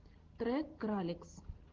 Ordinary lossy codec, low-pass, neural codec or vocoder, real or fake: Opus, 16 kbps; 7.2 kHz; none; real